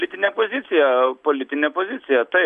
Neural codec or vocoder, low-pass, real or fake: none; 10.8 kHz; real